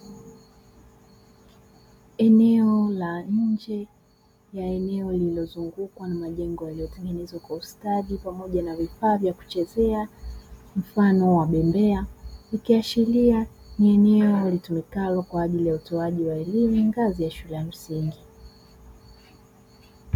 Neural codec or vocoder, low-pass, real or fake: none; 19.8 kHz; real